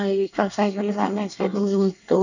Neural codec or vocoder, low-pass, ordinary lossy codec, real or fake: codec, 24 kHz, 1 kbps, SNAC; 7.2 kHz; none; fake